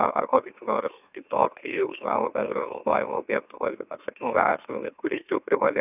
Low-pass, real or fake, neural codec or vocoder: 3.6 kHz; fake; autoencoder, 44.1 kHz, a latent of 192 numbers a frame, MeloTTS